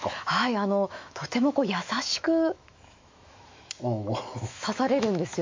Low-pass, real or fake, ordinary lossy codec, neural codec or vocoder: 7.2 kHz; fake; MP3, 48 kbps; autoencoder, 48 kHz, 128 numbers a frame, DAC-VAE, trained on Japanese speech